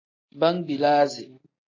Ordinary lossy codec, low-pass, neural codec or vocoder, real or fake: AAC, 32 kbps; 7.2 kHz; none; real